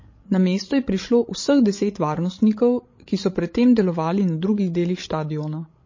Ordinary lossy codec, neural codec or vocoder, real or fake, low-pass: MP3, 32 kbps; codec, 16 kHz, 16 kbps, FreqCodec, larger model; fake; 7.2 kHz